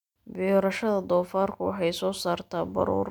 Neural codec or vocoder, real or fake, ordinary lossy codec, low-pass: none; real; none; 19.8 kHz